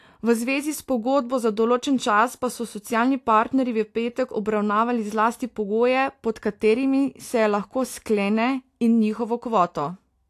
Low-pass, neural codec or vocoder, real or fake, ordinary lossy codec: 14.4 kHz; none; real; AAC, 64 kbps